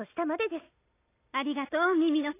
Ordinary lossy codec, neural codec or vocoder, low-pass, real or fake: AAC, 16 kbps; none; 3.6 kHz; real